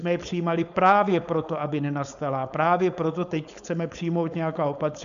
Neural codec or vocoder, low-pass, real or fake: codec, 16 kHz, 4.8 kbps, FACodec; 7.2 kHz; fake